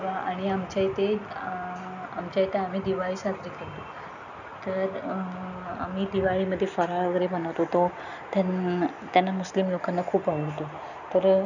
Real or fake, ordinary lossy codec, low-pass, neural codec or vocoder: real; none; 7.2 kHz; none